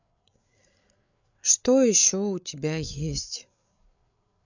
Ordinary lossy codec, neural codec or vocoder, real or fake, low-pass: none; codec, 16 kHz, 8 kbps, FreqCodec, larger model; fake; 7.2 kHz